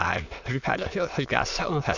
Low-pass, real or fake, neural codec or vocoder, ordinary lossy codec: 7.2 kHz; fake; autoencoder, 22.05 kHz, a latent of 192 numbers a frame, VITS, trained on many speakers; none